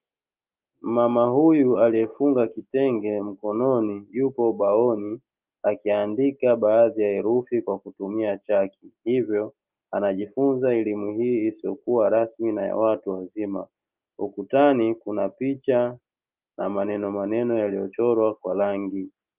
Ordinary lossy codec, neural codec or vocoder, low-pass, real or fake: Opus, 24 kbps; none; 3.6 kHz; real